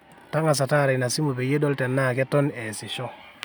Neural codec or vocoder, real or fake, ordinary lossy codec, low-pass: none; real; none; none